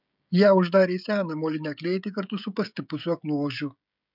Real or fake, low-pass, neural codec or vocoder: fake; 5.4 kHz; codec, 16 kHz, 16 kbps, FreqCodec, smaller model